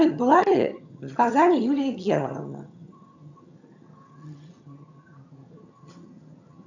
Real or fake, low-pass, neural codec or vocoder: fake; 7.2 kHz; vocoder, 22.05 kHz, 80 mel bands, HiFi-GAN